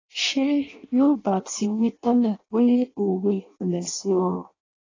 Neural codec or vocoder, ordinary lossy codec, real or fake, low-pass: codec, 16 kHz in and 24 kHz out, 0.6 kbps, FireRedTTS-2 codec; AAC, 32 kbps; fake; 7.2 kHz